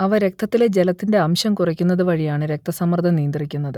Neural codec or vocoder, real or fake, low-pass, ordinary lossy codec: none; real; 19.8 kHz; none